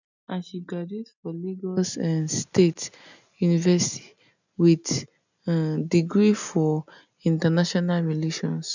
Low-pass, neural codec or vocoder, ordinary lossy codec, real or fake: 7.2 kHz; none; none; real